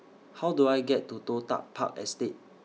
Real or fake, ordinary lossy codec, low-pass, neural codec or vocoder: real; none; none; none